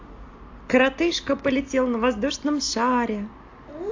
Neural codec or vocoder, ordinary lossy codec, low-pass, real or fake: none; AAC, 48 kbps; 7.2 kHz; real